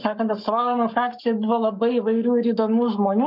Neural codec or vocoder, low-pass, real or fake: codec, 44.1 kHz, 7.8 kbps, Pupu-Codec; 5.4 kHz; fake